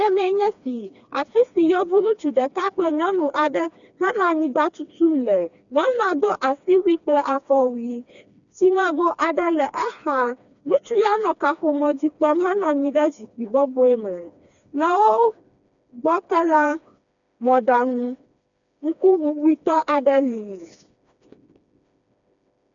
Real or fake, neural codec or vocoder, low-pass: fake; codec, 16 kHz, 2 kbps, FreqCodec, smaller model; 7.2 kHz